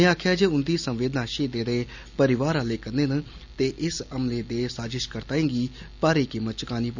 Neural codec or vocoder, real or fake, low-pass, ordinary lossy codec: none; real; 7.2 kHz; Opus, 64 kbps